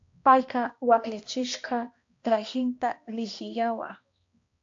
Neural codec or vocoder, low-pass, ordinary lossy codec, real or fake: codec, 16 kHz, 1 kbps, X-Codec, HuBERT features, trained on balanced general audio; 7.2 kHz; AAC, 48 kbps; fake